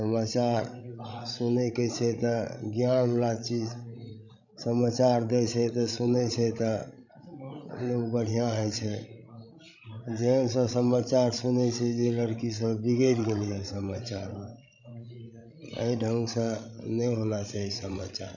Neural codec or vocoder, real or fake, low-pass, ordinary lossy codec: codec, 16 kHz, 16 kbps, FreqCodec, larger model; fake; 7.2 kHz; none